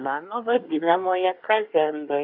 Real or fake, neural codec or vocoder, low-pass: fake; codec, 24 kHz, 1 kbps, SNAC; 5.4 kHz